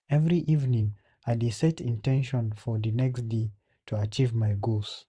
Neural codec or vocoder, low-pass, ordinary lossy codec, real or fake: vocoder, 44.1 kHz, 128 mel bands every 512 samples, BigVGAN v2; 9.9 kHz; none; fake